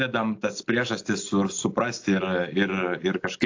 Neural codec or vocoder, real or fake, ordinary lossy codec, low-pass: none; real; AAC, 48 kbps; 7.2 kHz